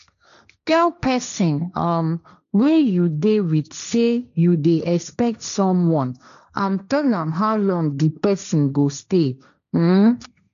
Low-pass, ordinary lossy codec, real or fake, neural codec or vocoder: 7.2 kHz; none; fake; codec, 16 kHz, 1.1 kbps, Voila-Tokenizer